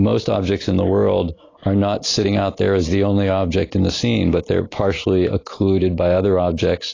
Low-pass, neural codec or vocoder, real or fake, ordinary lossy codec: 7.2 kHz; none; real; AAC, 32 kbps